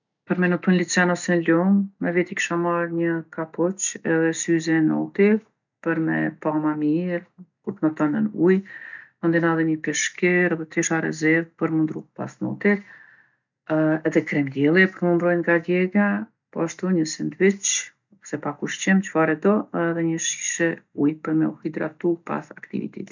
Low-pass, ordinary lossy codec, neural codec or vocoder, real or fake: 7.2 kHz; none; none; real